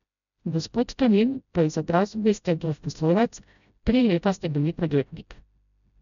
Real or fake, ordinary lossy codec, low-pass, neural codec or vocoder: fake; none; 7.2 kHz; codec, 16 kHz, 0.5 kbps, FreqCodec, smaller model